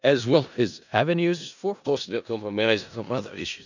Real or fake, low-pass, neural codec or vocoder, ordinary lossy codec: fake; 7.2 kHz; codec, 16 kHz in and 24 kHz out, 0.4 kbps, LongCat-Audio-Codec, four codebook decoder; none